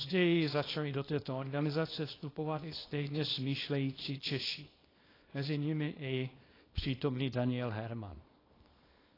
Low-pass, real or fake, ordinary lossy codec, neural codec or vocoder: 5.4 kHz; fake; AAC, 24 kbps; codec, 24 kHz, 0.9 kbps, WavTokenizer, small release